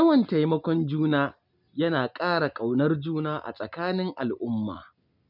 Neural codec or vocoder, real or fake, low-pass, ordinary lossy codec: none; real; 5.4 kHz; none